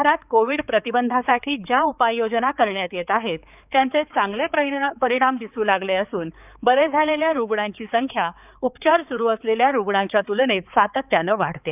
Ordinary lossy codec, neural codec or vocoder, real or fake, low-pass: none; codec, 16 kHz, 4 kbps, X-Codec, HuBERT features, trained on balanced general audio; fake; 3.6 kHz